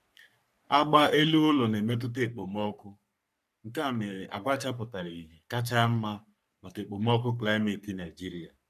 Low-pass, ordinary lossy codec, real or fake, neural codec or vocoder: 14.4 kHz; none; fake; codec, 44.1 kHz, 3.4 kbps, Pupu-Codec